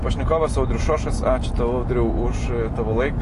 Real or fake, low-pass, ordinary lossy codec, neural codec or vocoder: real; 10.8 kHz; AAC, 48 kbps; none